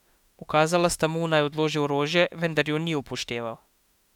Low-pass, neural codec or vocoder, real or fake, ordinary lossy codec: 19.8 kHz; autoencoder, 48 kHz, 32 numbers a frame, DAC-VAE, trained on Japanese speech; fake; none